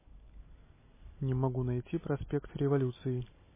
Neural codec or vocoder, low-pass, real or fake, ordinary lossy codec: none; 3.6 kHz; real; MP3, 16 kbps